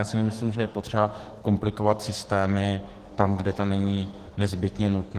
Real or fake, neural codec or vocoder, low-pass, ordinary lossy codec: fake; codec, 44.1 kHz, 2.6 kbps, SNAC; 14.4 kHz; Opus, 16 kbps